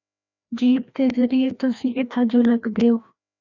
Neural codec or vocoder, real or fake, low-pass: codec, 16 kHz, 1 kbps, FreqCodec, larger model; fake; 7.2 kHz